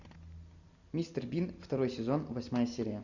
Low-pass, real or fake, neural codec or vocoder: 7.2 kHz; real; none